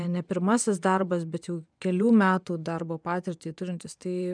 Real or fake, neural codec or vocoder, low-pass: fake; vocoder, 24 kHz, 100 mel bands, Vocos; 9.9 kHz